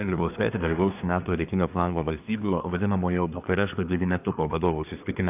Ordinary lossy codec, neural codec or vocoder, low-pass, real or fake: AAC, 32 kbps; codec, 24 kHz, 1 kbps, SNAC; 3.6 kHz; fake